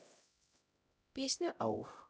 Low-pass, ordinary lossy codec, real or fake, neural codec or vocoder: none; none; fake; codec, 16 kHz, 0.5 kbps, X-Codec, HuBERT features, trained on LibriSpeech